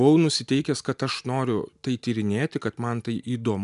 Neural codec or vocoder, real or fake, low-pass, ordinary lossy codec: none; real; 10.8 kHz; MP3, 96 kbps